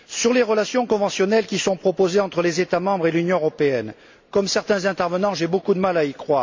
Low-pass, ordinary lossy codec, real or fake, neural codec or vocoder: 7.2 kHz; MP3, 48 kbps; real; none